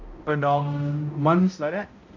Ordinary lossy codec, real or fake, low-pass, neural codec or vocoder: AAC, 48 kbps; fake; 7.2 kHz; codec, 16 kHz, 0.5 kbps, X-Codec, HuBERT features, trained on balanced general audio